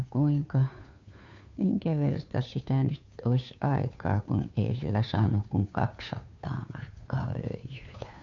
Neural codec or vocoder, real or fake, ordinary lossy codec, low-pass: codec, 16 kHz, 2 kbps, FunCodec, trained on Chinese and English, 25 frames a second; fake; MP3, 48 kbps; 7.2 kHz